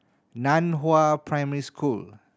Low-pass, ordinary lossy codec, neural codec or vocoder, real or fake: none; none; none; real